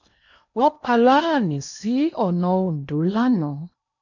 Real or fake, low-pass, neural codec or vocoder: fake; 7.2 kHz; codec, 16 kHz in and 24 kHz out, 0.8 kbps, FocalCodec, streaming, 65536 codes